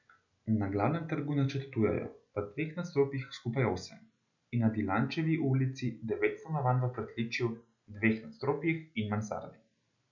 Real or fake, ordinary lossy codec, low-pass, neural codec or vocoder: real; none; 7.2 kHz; none